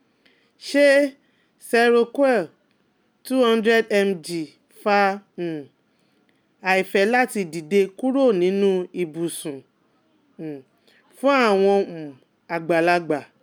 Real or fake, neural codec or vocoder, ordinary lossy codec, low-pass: real; none; none; none